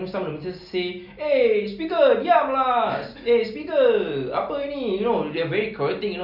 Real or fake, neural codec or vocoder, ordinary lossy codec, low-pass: real; none; none; 5.4 kHz